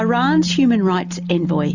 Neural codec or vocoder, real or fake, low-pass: none; real; 7.2 kHz